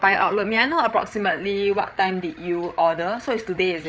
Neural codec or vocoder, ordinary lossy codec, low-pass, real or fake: codec, 16 kHz, 8 kbps, FreqCodec, larger model; none; none; fake